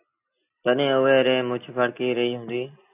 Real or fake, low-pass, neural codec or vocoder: real; 3.6 kHz; none